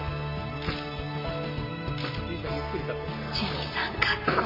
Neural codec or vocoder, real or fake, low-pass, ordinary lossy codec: none; real; 5.4 kHz; MP3, 24 kbps